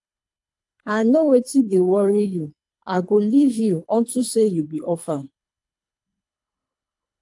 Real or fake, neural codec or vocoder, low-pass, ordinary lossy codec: fake; codec, 24 kHz, 3 kbps, HILCodec; 10.8 kHz; AAC, 64 kbps